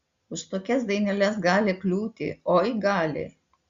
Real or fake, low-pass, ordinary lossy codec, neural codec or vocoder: real; 7.2 kHz; Opus, 64 kbps; none